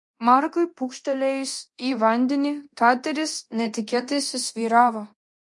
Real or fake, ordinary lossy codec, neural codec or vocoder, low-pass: fake; MP3, 48 kbps; codec, 24 kHz, 0.9 kbps, DualCodec; 10.8 kHz